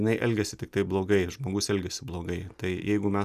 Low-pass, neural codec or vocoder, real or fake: 14.4 kHz; none; real